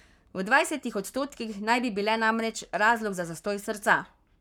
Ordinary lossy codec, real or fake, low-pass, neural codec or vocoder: none; fake; 19.8 kHz; codec, 44.1 kHz, 7.8 kbps, Pupu-Codec